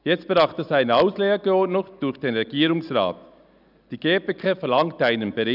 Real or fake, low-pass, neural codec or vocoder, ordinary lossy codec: real; 5.4 kHz; none; none